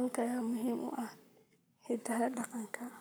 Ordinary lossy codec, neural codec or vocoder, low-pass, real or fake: none; codec, 44.1 kHz, 7.8 kbps, Pupu-Codec; none; fake